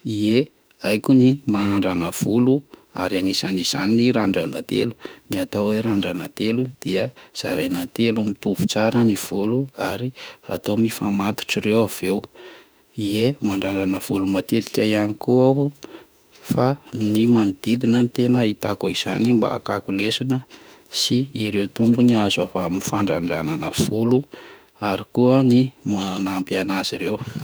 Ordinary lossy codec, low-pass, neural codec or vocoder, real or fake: none; none; autoencoder, 48 kHz, 32 numbers a frame, DAC-VAE, trained on Japanese speech; fake